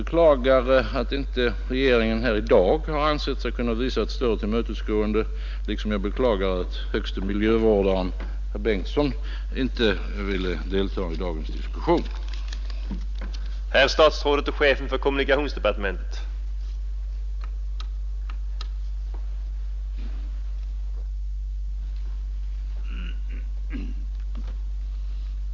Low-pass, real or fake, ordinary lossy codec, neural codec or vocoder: 7.2 kHz; real; none; none